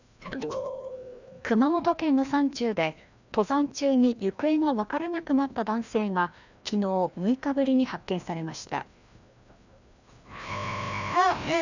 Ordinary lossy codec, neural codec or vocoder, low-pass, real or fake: none; codec, 16 kHz, 1 kbps, FreqCodec, larger model; 7.2 kHz; fake